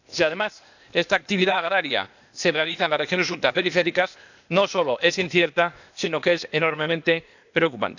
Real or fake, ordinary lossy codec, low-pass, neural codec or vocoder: fake; none; 7.2 kHz; codec, 16 kHz, 0.8 kbps, ZipCodec